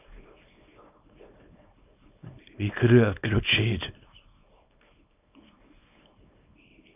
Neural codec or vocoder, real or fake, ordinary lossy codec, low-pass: codec, 24 kHz, 0.9 kbps, WavTokenizer, small release; fake; none; 3.6 kHz